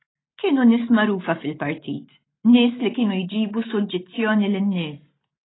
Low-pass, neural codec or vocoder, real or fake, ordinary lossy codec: 7.2 kHz; none; real; AAC, 16 kbps